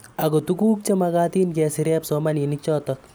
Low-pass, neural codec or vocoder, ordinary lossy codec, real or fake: none; none; none; real